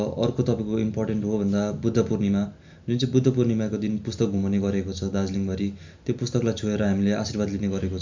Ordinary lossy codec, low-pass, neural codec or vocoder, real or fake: MP3, 64 kbps; 7.2 kHz; none; real